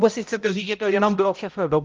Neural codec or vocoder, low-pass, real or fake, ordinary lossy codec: codec, 16 kHz, 0.5 kbps, X-Codec, HuBERT features, trained on balanced general audio; 7.2 kHz; fake; Opus, 24 kbps